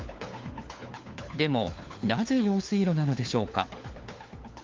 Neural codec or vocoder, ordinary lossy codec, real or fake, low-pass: codec, 16 kHz, 4 kbps, FunCodec, trained on LibriTTS, 50 frames a second; Opus, 32 kbps; fake; 7.2 kHz